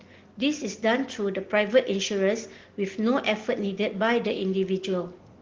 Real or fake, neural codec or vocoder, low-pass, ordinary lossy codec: real; none; 7.2 kHz; Opus, 16 kbps